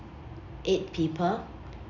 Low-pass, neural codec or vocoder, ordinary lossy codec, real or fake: 7.2 kHz; none; none; real